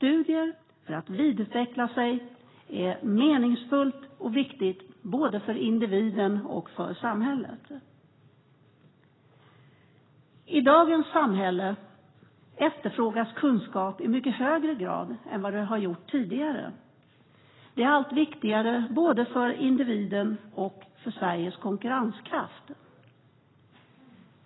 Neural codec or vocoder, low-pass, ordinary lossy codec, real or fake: none; 7.2 kHz; AAC, 16 kbps; real